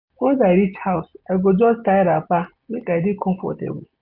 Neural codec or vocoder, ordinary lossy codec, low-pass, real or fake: none; none; 5.4 kHz; real